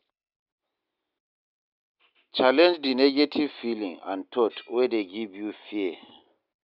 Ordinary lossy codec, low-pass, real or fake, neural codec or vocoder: none; 5.4 kHz; real; none